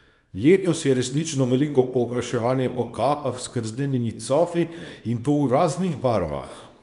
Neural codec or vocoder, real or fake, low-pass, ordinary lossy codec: codec, 24 kHz, 0.9 kbps, WavTokenizer, small release; fake; 10.8 kHz; none